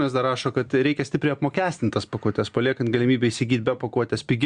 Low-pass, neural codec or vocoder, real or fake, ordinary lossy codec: 9.9 kHz; none; real; Opus, 64 kbps